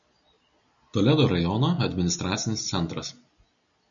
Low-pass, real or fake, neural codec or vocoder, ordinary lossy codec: 7.2 kHz; real; none; MP3, 96 kbps